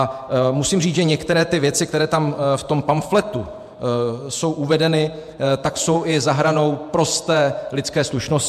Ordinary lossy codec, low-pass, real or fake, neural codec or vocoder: MP3, 96 kbps; 14.4 kHz; fake; vocoder, 48 kHz, 128 mel bands, Vocos